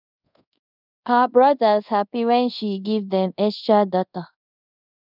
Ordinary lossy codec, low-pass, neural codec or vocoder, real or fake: none; 5.4 kHz; codec, 24 kHz, 0.5 kbps, DualCodec; fake